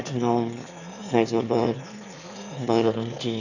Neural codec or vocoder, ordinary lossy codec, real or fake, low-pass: autoencoder, 22.05 kHz, a latent of 192 numbers a frame, VITS, trained on one speaker; none; fake; 7.2 kHz